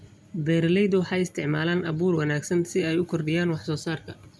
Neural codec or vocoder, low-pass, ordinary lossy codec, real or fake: none; none; none; real